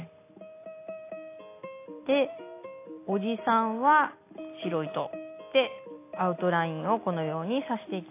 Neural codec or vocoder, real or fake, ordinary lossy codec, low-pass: none; real; AAC, 24 kbps; 3.6 kHz